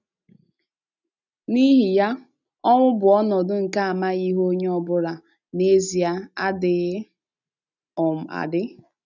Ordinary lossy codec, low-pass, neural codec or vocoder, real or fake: none; 7.2 kHz; none; real